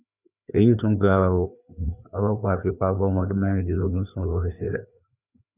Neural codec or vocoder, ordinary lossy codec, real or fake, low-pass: codec, 16 kHz, 2 kbps, FreqCodec, larger model; none; fake; 3.6 kHz